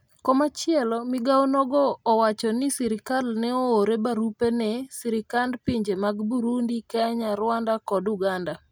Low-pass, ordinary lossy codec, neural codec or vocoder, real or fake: none; none; none; real